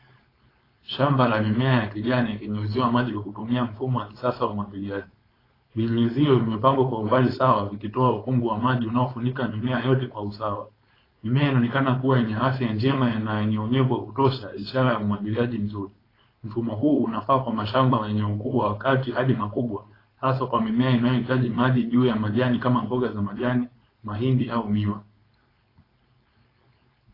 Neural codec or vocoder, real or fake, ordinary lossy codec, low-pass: codec, 16 kHz, 4.8 kbps, FACodec; fake; AAC, 24 kbps; 5.4 kHz